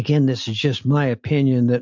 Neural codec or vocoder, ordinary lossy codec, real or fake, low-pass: none; MP3, 64 kbps; real; 7.2 kHz